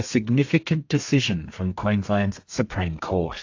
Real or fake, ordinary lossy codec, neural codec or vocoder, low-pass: fake; AAC, 48 kbps; codec, 32 kHz, 1.9 kbps, SNAC; 7.2 kHz